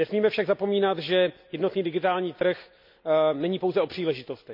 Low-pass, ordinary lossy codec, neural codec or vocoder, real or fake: 5.4 kHz; MP3, 48 kbps; none; real